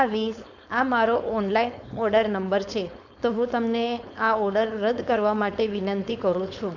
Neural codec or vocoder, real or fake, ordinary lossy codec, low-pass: codec, 16 kHz, 4.8 kbps, FACodec; fake; none; 7.2 kHz